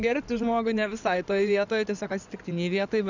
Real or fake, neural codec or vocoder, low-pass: fake; codec, 16 kHz in and 24 kHz out, 2.2 kbps, FireRedTTS-2 codec; 7.2 kHz